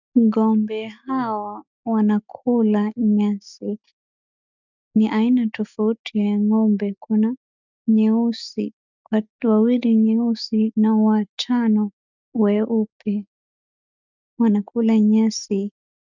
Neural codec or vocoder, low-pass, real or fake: none; 7.2 kHz; real